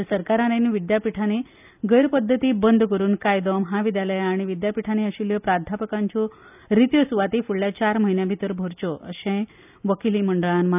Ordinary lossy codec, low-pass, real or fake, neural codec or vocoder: none; 3.6 kHz; real; none